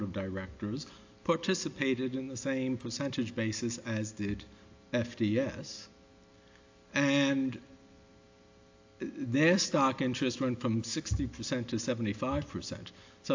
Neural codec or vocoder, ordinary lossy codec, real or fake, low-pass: none; AAC, 48 kbps; real; 7.2 kHz